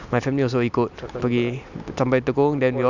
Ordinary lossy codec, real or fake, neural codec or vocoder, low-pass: none; real; none; 7.2 kHz